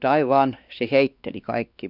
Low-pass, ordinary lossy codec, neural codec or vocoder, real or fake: 5.4 kHz; none; codec, 16 kHz, 2 kbps, X-Codec, WavLM features, trained on Multilingual LibriSpeech; fake